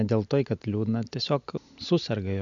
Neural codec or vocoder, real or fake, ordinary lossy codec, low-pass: none; real; MP3, 64 kbps; 7.2 kHz